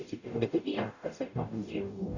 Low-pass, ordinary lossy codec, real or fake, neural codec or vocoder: 7.2 kHz; none; fake; codec, 44.1 kHz, 0.9 kbps, DAC